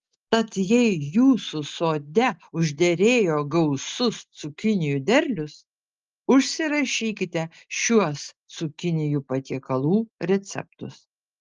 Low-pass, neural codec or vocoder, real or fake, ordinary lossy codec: 7.2 kHz; none; real; Opus, 32 kbps